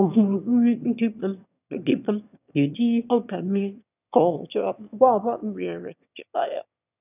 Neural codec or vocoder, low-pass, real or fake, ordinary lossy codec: autoencoder, 22.05 kHz, a latent of 192 numbers a frame, VITS, trained on one speaker; 3.6 kHz; fake; none